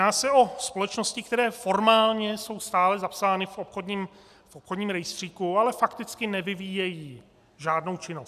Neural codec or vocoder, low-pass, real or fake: none; 14.4 kHz; real